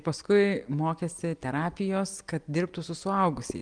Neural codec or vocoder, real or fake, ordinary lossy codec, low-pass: none; real; Opus, 32 kbps; 9.9 kHz